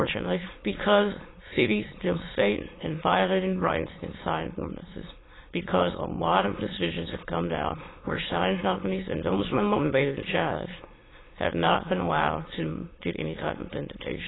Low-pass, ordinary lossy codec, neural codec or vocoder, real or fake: 7.2 kHz; AAC, 16 kbps; autoencoder, 22.05 kHz, a latent of 192 numbers a frame, VITS, trained on many speakers; fake